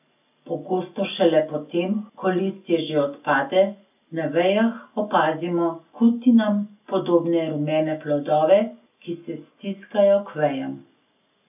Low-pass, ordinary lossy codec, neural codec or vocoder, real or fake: 3.6 kHz; none; none; real